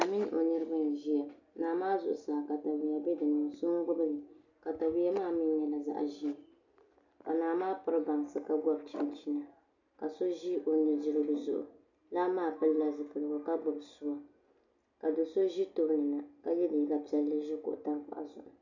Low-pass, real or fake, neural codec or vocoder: 7.2 kHz; real; none